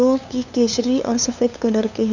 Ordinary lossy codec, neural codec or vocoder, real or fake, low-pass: none; codec, 16 kHz, 2 kbps, FunCodec, trained on LibriTTS, 25 frames a second; fake; 7.2 kHz